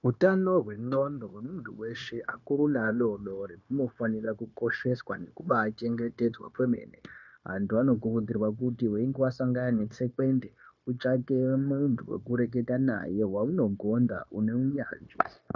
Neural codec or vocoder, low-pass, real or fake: codec, 16 kHz, 0.9 kbps, LongCat-Audio-Codec; 7.2 kHz; fake